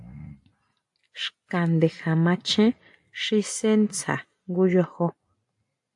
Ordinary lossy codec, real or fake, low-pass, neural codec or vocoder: AAC, 64 kbps; real; 10.8 kHz; none